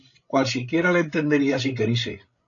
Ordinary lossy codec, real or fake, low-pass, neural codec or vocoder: AAC, 48 kbps; fake; 7.2 kHz; codec, 16 kHz, 16 kbps, FreqCodec, larger model